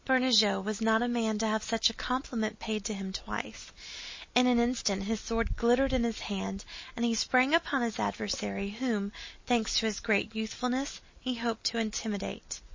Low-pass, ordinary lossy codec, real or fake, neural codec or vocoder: 7.2 kHz; MP3, 32 kbps; real; none